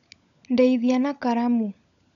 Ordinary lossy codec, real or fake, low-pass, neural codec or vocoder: none; fake; 7.2 kHz; codec, 16 kHz, 16 kbps, FunCodec, trained on LibriTTS, 50 frames a second